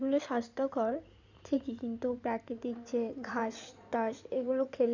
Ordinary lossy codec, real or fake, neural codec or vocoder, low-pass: none; fake; codec, 16 kHz in and 24 kHz out, 2.2 kbps, FireRedTTS-2 codec; 7.2 kHz